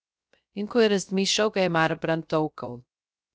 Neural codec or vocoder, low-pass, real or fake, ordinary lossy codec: codec, 16 kHz, 0.3 kbps, FocalCodec; none; fake; none